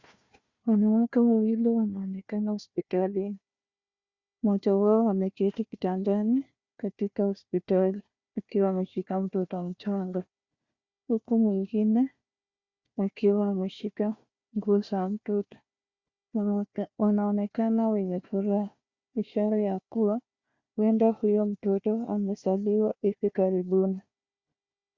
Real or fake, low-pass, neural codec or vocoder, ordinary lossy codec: fake; 7.2 kHz; codec, 16 kHz, 1 kbps, FunCodec, trained on Chinese and English, 50 frames a second; Opus, 64 kbps